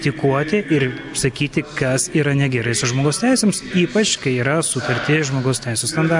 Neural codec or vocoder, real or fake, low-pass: none; real; 10.8 kHz